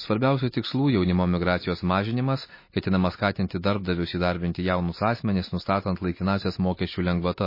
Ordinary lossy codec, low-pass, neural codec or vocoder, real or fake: MP3, 24 kbps; 5.4 kHz; none; real